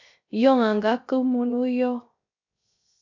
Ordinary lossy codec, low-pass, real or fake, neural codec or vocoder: MP3, 64 kbps; 7.2 kHz; fake; codec, 16 kHz, 0.3 kbps, FocalCodec